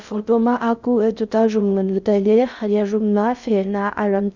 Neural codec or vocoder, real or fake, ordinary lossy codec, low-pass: codec, 16 kHz in and 24 kHz out, 0.6 kbps, FocalCodec, streaming, 2048 codes; fake; Opus, 64 kbps; 7.2 kHz